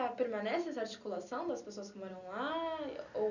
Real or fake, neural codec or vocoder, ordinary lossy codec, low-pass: real; none; none; 7.2 kHz